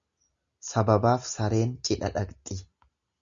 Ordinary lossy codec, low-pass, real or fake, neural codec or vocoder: Opus, 64 kbps; 7.2 kHz; real; none